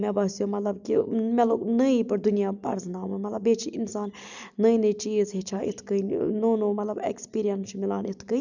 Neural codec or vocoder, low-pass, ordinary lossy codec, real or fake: none; 7.2 kHz; none; real